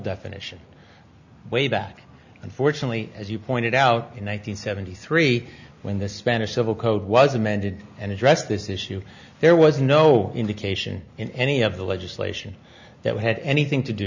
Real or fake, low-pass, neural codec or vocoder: real; 7.2 kHz; none